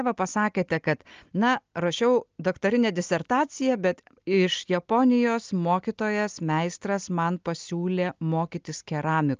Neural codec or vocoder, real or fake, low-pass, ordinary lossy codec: none; real; 7.2 kHz; Opus, 24 kbps